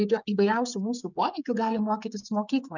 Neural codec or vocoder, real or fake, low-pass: codec, 16 kHz, 16 kbps, FreqCodec, smaller model; fake; 7.2 kHz